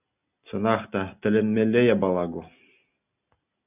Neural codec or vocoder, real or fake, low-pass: none; real; 3.6 kHz